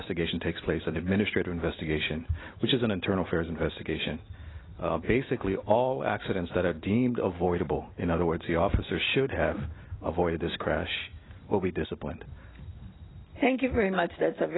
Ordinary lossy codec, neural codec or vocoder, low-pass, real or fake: AAC, 16 kbps; none; 7.2 kHz; real